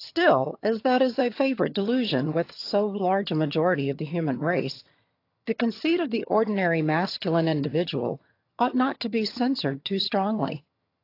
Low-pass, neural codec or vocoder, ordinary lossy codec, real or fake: 5.4 kHz; vocoder, 22.05 kHz, 80 mel bands, HiFi-GAN; AAC, 32 kbps; fake